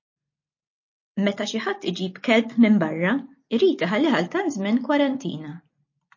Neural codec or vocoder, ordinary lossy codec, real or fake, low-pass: none; MP3, 32 kbps; real; 7.2 kHz